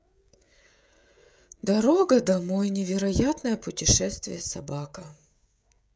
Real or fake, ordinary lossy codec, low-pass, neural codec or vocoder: fake; none; none; codec, 16 kHz, 16 kbps, FreqCodec, smaller model